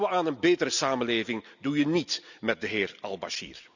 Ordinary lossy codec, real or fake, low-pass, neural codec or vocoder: none; real; 7.2 kHz; none